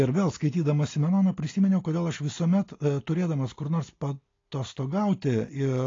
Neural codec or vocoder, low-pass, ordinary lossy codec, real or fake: none; 7.2 kHz; AAC, 32 kbps; real